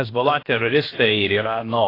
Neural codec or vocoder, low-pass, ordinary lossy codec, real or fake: codec, 16 kHz, about 1 kbps, DyCAST, with the encoder's durations; 5.4 kHz; AAC, 24 kbps; fake